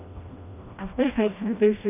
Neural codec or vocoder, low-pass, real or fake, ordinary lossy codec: codec, 16 kHz, 1 kbps, FreqCodec, smaller model; 3.6 kHz; fake; none